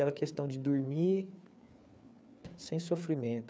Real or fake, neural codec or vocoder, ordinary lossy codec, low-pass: fake; codec, 16 kHz, 4 kbps, FreqCodec, larger model; none; none